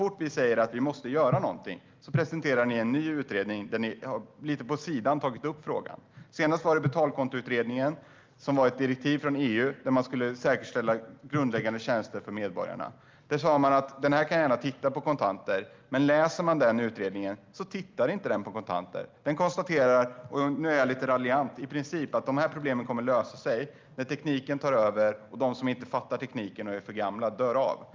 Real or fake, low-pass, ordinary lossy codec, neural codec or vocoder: real; 7.2 kHz; Opus, 24 kbps; none